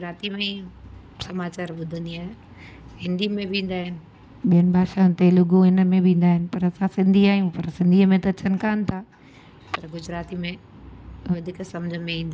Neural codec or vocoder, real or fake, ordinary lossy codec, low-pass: none; real; none; none